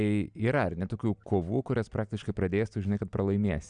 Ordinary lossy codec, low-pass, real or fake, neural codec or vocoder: Opus, 64 kbps; 9.9 kHz; real; none